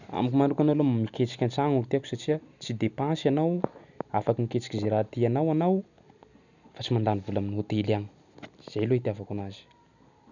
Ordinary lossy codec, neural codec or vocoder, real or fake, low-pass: none; none; real; 7.2 kHz